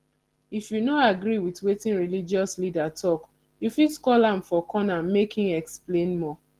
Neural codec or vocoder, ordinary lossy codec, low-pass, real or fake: none; Opus, 16 kbps; 14.4 kHz; real